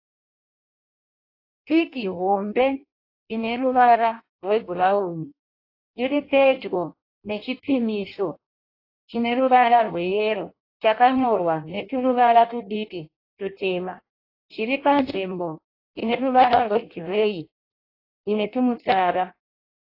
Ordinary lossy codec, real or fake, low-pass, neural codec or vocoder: AAC, 32 kbps; fake; 5.4 kHz; codec, 16 kHz in and 24 kHz out, 0.6 kbps, FireRedTTS-2 codec